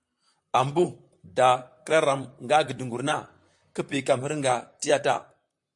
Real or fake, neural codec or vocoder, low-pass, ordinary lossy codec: fake; vocoder, 44.1 kHz, 128 mel bands, Pupu-Vocoder; 10.8 kHz; MP3, 64 kbps